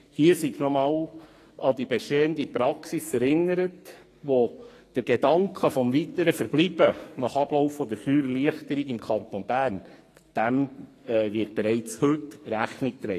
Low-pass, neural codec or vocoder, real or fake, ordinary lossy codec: 14.4 kHz; codec, 44.1 kHz, 2.6 kbps, SNAC; fake; AAC, 48 kbps